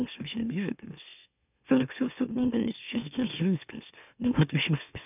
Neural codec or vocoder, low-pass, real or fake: autoencoder, 44.1 kHz, a latent of 192 numbers a frame, MeloTTS; 3.6 kHz; fake